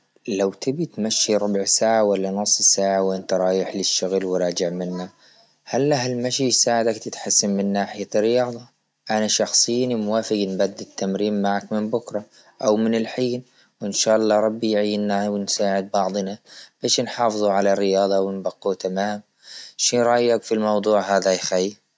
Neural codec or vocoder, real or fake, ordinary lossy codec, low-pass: none; real; none; none